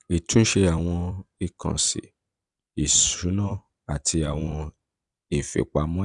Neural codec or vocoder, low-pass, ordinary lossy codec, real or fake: vocoder, 44.1 kHz, 128 mel bands every 256 samples, BigVGAN v2; 10.8 kHz; none; fake